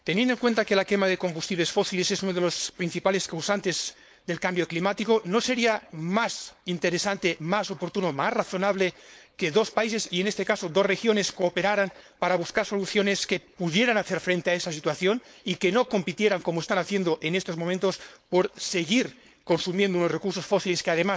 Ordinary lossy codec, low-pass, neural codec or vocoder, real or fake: none; none; codec, 16 kHz, 4.8 kbps, FACodec; fake